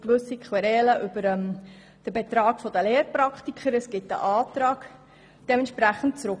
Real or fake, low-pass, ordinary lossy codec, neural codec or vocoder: real; none; none; none